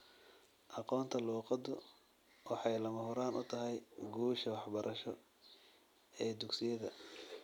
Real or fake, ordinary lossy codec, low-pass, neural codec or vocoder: real; none; 19.8 kHz; none